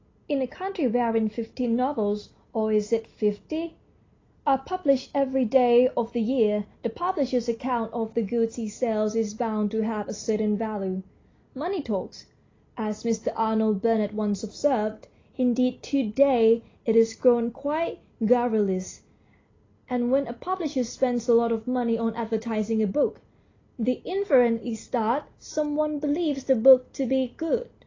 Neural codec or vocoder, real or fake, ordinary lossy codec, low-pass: none; real; AAC, 32 kbps; 7.2 kHz